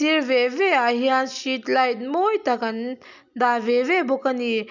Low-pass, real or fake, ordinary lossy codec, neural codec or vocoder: 7.2 kHz; real; none; none